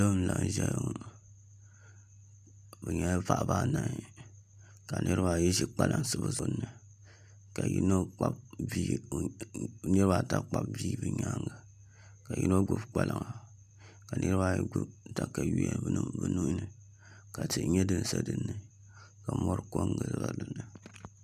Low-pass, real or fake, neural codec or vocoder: 14.4 kHz; real; none